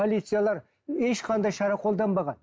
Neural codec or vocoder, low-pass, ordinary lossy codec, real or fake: none; none; none; real